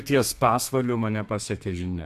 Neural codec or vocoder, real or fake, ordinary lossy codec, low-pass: codec, 32 kHz, 1.9 kbps, SNAC; fake; AAC, 64 kbps; 14.4 kHz